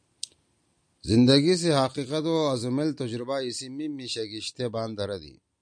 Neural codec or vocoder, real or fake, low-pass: none; real; 9.9 kHz